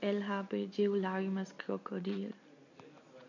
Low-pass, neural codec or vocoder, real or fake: 7.2 kHz; none; real